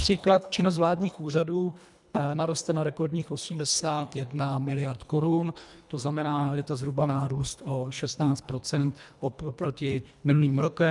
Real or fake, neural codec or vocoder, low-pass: fake; codec, 24 kHz, 1.5 kbps, HILCodec; 10.8 kHz